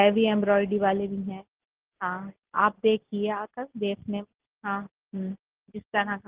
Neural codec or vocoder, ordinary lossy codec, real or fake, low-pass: none; Opus, 16 kbps; real; 3.6 kHz